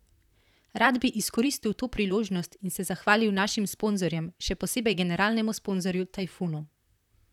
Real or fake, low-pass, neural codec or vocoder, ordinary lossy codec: fake; 19.8 kHz; vocoder, 44.1 kHz, 128 mel bands, Pupu-Vocoder; none